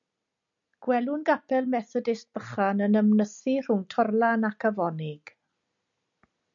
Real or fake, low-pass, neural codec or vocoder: real; 7.2 kHz; none